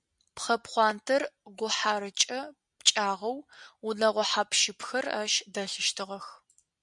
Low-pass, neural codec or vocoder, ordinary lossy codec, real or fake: 10.8 kHz; none; MP3, 96 kbps; real